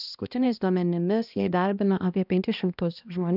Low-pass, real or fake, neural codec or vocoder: 5.4 kHz; fake; codec, 16 kHz, 1 kbps, X-Codec, HuBERT features, trained on balanced general audio